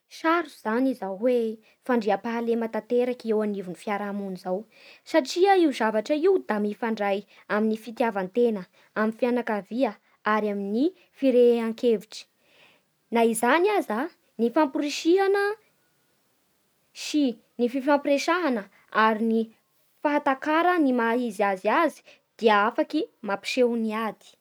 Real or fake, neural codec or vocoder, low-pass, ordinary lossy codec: real; none; none; none